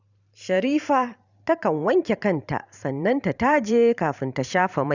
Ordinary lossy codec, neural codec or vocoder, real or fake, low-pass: none; vocoder, 44.1 kHz, 128 mel bands every 512 samples, BigVGAN v2; fake; 7.2 kHz